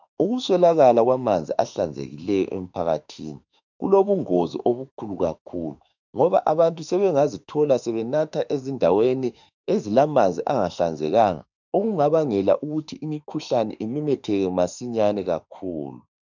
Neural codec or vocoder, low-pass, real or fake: autoencoder, 48 kHz, 32 numbers a frame, DAC-VAE, trained on Japanese speech; 7.2 kHz; fake